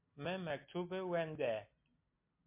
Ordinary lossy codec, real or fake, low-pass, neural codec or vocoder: MP3, 24 kbps; real; 3.6 kHz; none